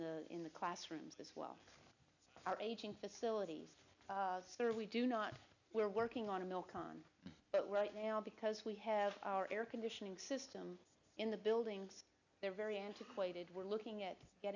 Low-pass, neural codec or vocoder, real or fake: 7.2 kHz; none; real